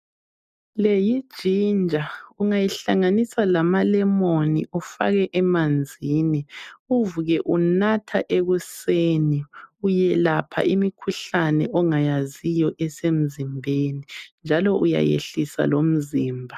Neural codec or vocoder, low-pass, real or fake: none; 14.4 kHz; real